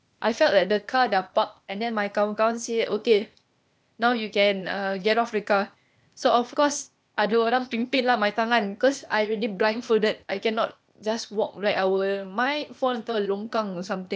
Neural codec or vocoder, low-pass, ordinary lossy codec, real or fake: codec, 16 kHz, 0.8 kbps, ZipCodec; none; none; fake